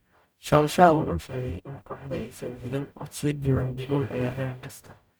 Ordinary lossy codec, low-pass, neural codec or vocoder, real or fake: none; none; codec, 44.1 kHz, 0.9 kbps, DAC; fake